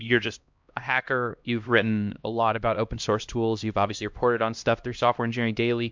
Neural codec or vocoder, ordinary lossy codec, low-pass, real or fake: codec, 16 kHz, 1 kbps, X-Codec, HuBERT features, trained on LibriSpeech; MP3, 64 kbps; 7.2 kHz; fake